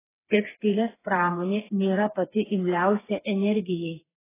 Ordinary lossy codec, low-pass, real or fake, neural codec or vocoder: AAC, 16 kbps; 3.6 kHz; fake; codec, 16 kHz, 4 kbps, FreqCodec, smaller model